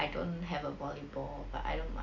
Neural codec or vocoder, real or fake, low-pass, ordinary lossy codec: none; real; 7.2 kHz; AAC, 48 kbps